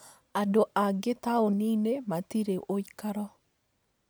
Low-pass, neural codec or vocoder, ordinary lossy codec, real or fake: none; none; none; real